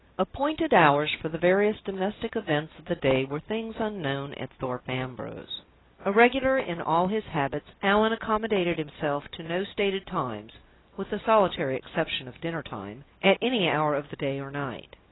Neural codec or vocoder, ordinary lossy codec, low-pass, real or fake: none; AAC, 16 kbps; 7.2 kHz; real